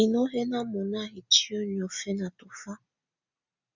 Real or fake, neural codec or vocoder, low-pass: real; none; 7.2 kHz